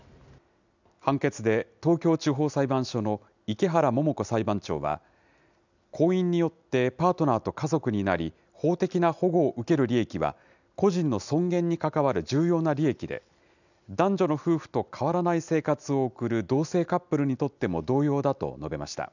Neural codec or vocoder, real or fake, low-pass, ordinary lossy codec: none; real; 7.2 kHz; none